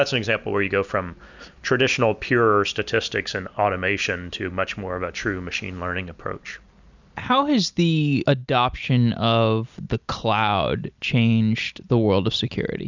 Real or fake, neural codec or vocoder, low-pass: real; none; 7.2 kHz